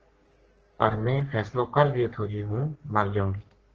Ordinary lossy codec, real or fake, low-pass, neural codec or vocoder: Opus, 16 kbps; fake; 7.2 kHz; codec, 44.1 kHz, 3.4 kbps, Pupu-Codec